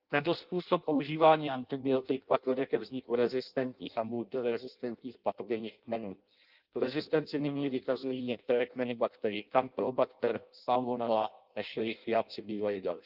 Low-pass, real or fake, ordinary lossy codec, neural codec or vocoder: 5.4 kHz; fake; Opus, 24 kbps; codec, 16 kHz in and 24 kHz out, 0.6 kbps, FireRedTTS-2 codec